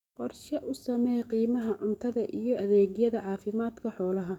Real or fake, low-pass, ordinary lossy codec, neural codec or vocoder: fake; 19.8 kHz; none; codec, 44.1 kHz, 7.8 kbps, DAC